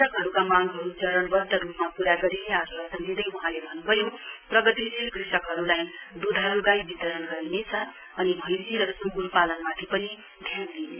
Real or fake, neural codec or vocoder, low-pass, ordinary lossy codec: real; none; 3.6 kHz; none